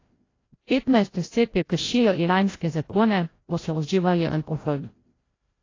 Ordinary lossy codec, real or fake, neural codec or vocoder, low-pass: AAC, 32 kbps; fake; codec, 16 kHz, 0.5 kbps, FreqCodec, larger model; 7.2 kHz